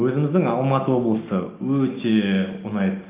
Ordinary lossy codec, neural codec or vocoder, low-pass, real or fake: Opus, 24 kbps; none; 3.6 kHz; real